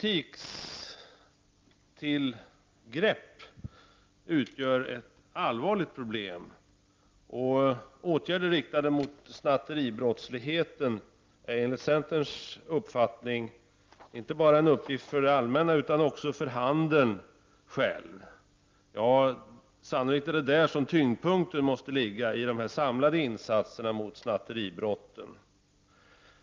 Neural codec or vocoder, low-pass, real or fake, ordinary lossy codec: none; 7.2 kHz; real; Opus, 24 kbps